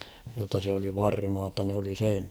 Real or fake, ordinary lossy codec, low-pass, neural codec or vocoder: fake; none; none; codec, 44.1 kHz, 2.6 kbps, SNAC